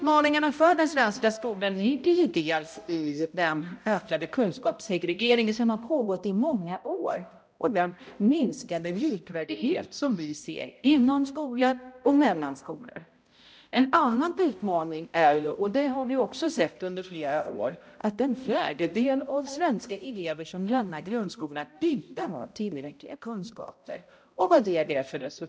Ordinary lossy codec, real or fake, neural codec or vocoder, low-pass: none; fake; codec, 16 kHz, 0.5 kbps, X-Codec, HuBERT features, trained on balanced general audio; none